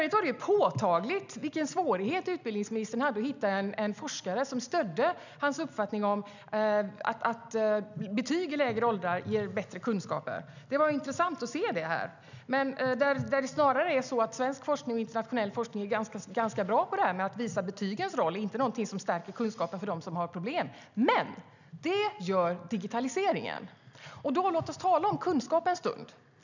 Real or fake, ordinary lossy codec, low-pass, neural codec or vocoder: real; none; 7.2 kHz; none